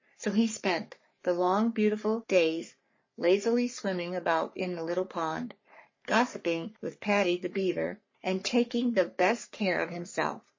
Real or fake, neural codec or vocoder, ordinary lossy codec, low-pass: fake; codec, 44.1 kHz, 3.4 kbps, Pupu-Codec; MP3, 32 kbps; 7.2 kHz